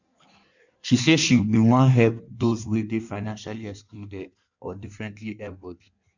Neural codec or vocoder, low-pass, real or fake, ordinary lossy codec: codec, 16 kHz in and 24 kHz out, 1.1 kbps, FireRedTTS-2 codec; 7.2 kHz; fake; none